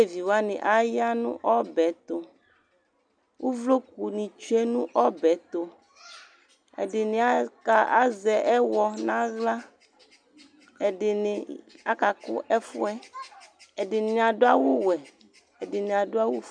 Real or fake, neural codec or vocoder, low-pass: real; none; 9.9 kHz